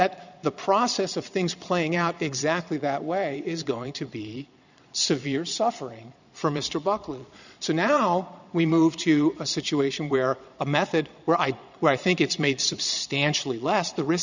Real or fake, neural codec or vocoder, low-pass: fake; vocoder, 22.05 kHz, 80 mel bands, Vocos; 7.2 kHz